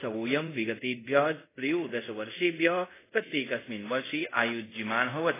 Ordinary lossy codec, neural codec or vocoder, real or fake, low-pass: AAC, 16 kbps; codec, 24 kHz, 0.5 kbps, DualCodec; fake; 3.6 kHz